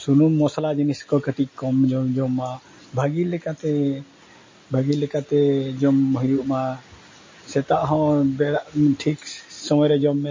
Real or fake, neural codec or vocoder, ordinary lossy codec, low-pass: real; none; MP3, 32 kbps; 7.2 kHz